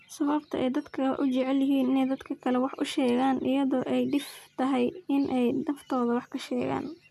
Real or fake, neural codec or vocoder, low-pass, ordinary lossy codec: real; none; 14.4 kHz; none